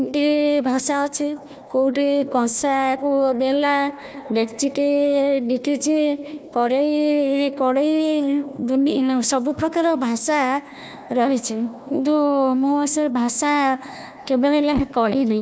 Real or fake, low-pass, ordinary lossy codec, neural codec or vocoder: fake; none; none; codec, 16 kHz, 1 kbps, FunCodec, trained on Chinese and English, 50 frames a second